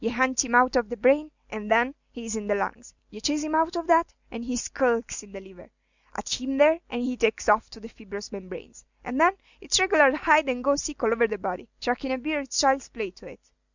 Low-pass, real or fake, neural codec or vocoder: 7.2 kHz; real; none